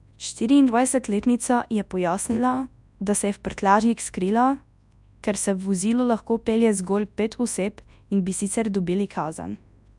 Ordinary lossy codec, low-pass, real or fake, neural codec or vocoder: none; 10.8 kHz; fake; codec, 24 kHz, 0.9 kbps, WavTokenizer, large speech release